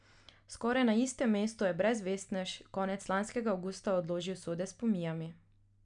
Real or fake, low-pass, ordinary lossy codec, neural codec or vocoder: real; 9.9 kHz; none; none